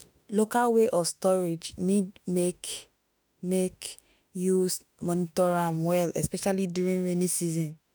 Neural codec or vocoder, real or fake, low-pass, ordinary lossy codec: autoencoder, 48 kHz, 32 numbers a frame, DAC-VAE, trained on Japanese speech; fake; none; none